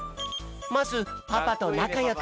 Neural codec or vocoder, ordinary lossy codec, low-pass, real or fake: none; none; none; real